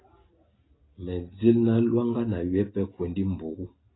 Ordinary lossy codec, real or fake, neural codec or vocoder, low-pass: AAC, 16 kbps; real; none; 7.2 kHz